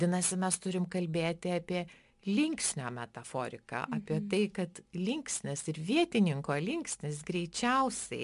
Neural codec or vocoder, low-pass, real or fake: none; 10.8 kHz; real